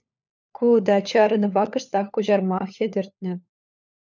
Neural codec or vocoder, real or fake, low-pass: codec, 16 kHz, 4 kbps, FunCodec, trained on LibriTTS, 50 frames a second; fake; 7.2 kHz